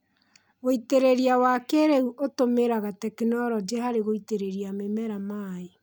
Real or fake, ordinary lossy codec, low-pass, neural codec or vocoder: real; none; none; none